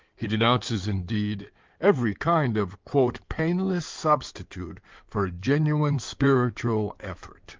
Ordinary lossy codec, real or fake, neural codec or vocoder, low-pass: Opus, 24 kbps; fake; codec, 16 kHz in and 24 kHz out, 2.2 kbps, FireRedTTS-2 codec; 7.2 kHz